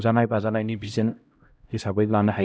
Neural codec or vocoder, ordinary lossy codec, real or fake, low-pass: codec, 16 kHz, 0.5 kbps, X-Codec, HuBERT features, trained on LibriSpeech; none; fake; none